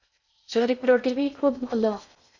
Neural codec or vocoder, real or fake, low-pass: codec, 16 kHz in and 24 kHz out, 0.6 kbps, FocalCodec, streaming, 2048 codes; fake; 7.2 kHz